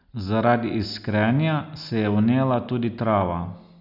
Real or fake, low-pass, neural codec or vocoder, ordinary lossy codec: real; 5.4 kHz; none; none